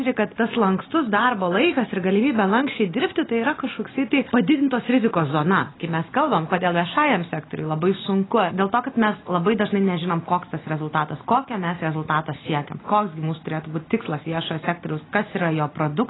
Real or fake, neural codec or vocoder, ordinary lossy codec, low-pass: real; none; AAC, 16 kbps; 7.2 kHz